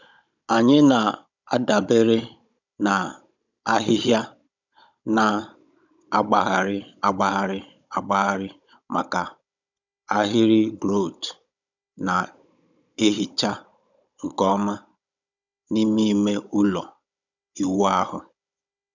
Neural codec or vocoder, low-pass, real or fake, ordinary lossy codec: codec, 16 kHz, 16 kbps, FunCodec, trained on Chinese and English, 50 frames a second; 7.2 kHz; fake; none